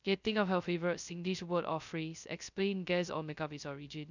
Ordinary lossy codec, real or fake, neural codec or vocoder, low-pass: none; fake; codec, 16 kHz, 0.2 kbps, FocalCodec; 7.2 kHz